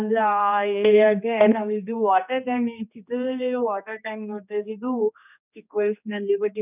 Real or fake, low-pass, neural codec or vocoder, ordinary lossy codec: fake; 3.6 kHz; codec, 16 kHz, 2 kbps, X-Codec, HuBERT features, trained on general audio; none